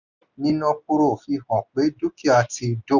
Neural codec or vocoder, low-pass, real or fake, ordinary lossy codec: none; 7.2 kHz; real; none